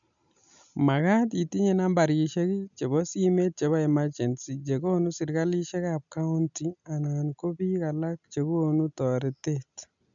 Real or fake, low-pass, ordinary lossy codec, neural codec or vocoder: real; 7.2 kHz; none; none